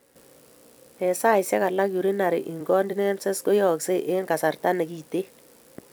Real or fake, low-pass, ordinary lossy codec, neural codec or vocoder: fake; none; none; vocoder, 44.1 kHz, 128 mel bands every 256 samples, BigVGAN v2